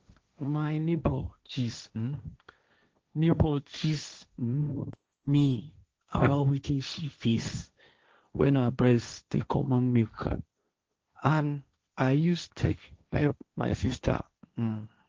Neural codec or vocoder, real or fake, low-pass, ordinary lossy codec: codec, 16 kHz, 1.1 kbps, Voila-Tokenizer; fake; 7.2 kHz; Opus, 24 kbps